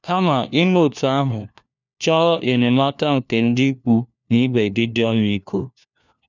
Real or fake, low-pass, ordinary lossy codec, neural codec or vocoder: fake; 7.2 kHz; none; codec, 16 kHz, 1 kbps, FunCodec, trained on LibriTTS, 50 frames a second